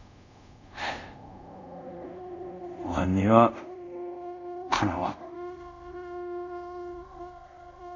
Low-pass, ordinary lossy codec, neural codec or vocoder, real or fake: 7.2 kHz; none; codec, 24 kHz, 0.5 kbps, DualCodec; fake